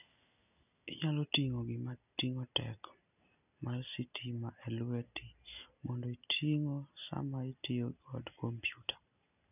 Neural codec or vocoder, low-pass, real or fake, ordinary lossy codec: none; 3.6 kHz; real; none